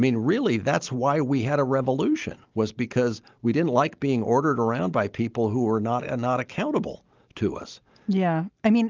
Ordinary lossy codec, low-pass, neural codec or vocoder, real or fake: Opus, 32 kbps; 7.2 kHz; none; real